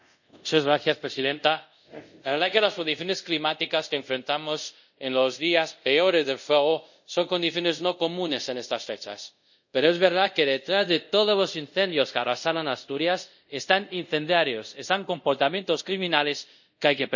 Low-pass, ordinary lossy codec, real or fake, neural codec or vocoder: 7.2 kHz; none; fake; codec, 24 kHz, 0.5 kbps, DualCodec